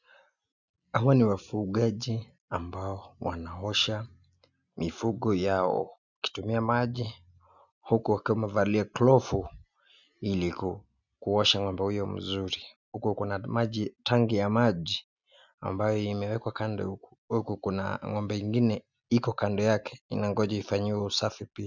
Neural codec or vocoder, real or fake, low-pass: none; real; 7.2 kHz